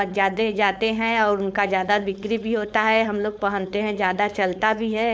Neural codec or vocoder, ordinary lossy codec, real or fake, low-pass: codec, 16 kHz, 4.8 kbps, FACodec; none; fake; none